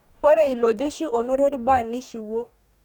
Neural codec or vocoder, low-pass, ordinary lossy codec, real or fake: codec, 44.1 kHz, 2.6 kbps, DAC; 19.8 kHz; none; fake